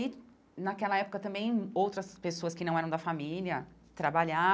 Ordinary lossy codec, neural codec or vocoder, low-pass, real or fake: none; none; none; real